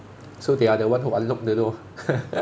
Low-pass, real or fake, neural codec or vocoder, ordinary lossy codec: none; real; none; none